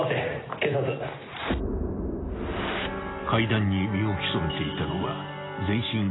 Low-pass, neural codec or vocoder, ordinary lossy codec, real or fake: 7.2 kHz; none; AAC, 16 kbps; real